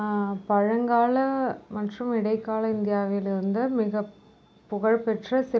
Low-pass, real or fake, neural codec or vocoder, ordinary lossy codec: none; real; none; none